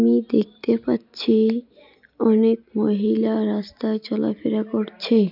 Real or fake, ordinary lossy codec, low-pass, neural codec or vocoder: real; none; 5.4 kHz; none